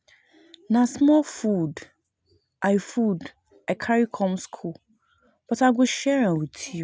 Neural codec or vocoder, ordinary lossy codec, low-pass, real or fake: none; none; none; real